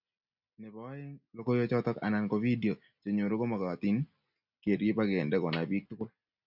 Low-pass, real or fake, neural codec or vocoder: 5.4 kHz; real; none